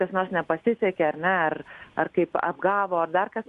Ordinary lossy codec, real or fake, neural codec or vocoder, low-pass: Opus, 64 kbps; real; none; 10.8 kHz